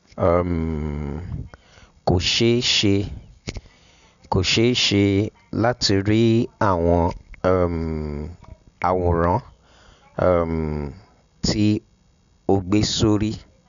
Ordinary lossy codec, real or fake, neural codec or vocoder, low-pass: none; real; none; 7.2 kHz